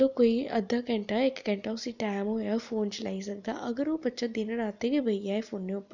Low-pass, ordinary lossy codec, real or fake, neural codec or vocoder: 7.2 kHz; none; real; none